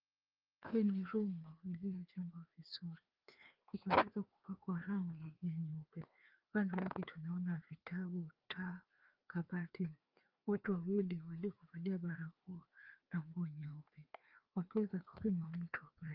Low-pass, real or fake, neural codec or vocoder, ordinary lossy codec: 5.4 kHz; fake; codec, 16 kHz, 2 kbps, FreqCodec, larger model; Opus, 32 kbps